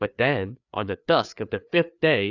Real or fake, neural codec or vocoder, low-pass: fake; codec, 16 kHz, 4 kbps, FreqCodec, larger model; 7.2 kHz